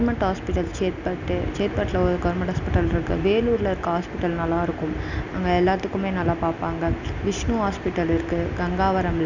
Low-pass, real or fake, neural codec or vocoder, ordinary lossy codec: 7.2 kHz; real; none; none